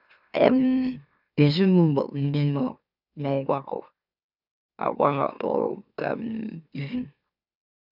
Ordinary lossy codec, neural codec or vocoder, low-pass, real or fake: none; autoencoder, 44.1 kHz, a latent of 192 numbers a frame, MeloTTS; 5.4 kHz; fake